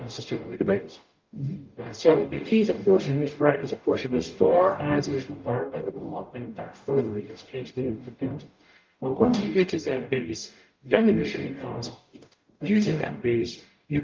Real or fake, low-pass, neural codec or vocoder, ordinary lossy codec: fake; 7.2 kHz; codec, 44.1 kHz, 0.9 kbps, DAC; Opus, 24 kbps